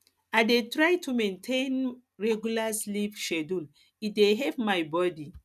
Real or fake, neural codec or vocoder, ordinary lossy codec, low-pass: real; none; none; 14.4 kHz